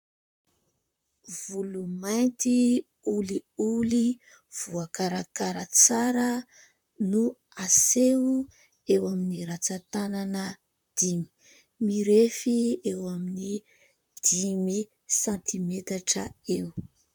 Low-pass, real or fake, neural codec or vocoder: 19.8 kHz; fake; vocoder, 44.1 kHz, 128 mel bands, Pupu-Vocoder